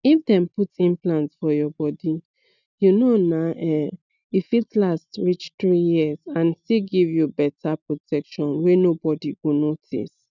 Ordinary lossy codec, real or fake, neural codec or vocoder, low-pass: none; real; none; 7.2 kHz